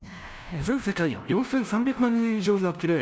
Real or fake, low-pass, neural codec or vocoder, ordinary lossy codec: fake; none; codec, 16 kHz, 0.5 kbps, FunCodec, trained on LibriTTS, 25 frames a second; none